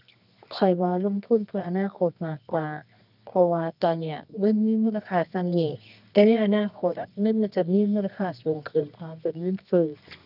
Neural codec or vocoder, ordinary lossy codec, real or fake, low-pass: codec, 24 kHz, 0.9 kbps, WavTokenizer, medium music audio release; none; fake; 5.4 kHz